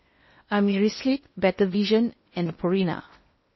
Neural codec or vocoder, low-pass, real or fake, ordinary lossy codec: codec, 16 kHz in and 24 kHz out, 0.8 kbps, FocalCodec, streaming, 65536 codes; 7.2 kHz; fake; MP3, 24 kbps